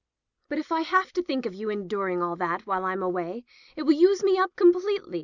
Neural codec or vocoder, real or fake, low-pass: none; real; 7.2 kHz